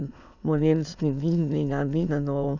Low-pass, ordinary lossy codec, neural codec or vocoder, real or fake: 7.2 kHz; none; autoencoder, 22.05 kHz, a latent of 192 numbers a frame, VITS, trained on many speakers; fake